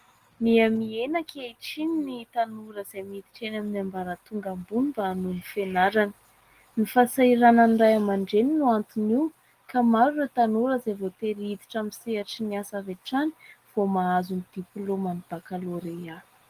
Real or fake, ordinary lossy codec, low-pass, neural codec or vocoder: real; Opus, 24 kbps; 14.4 kHz; none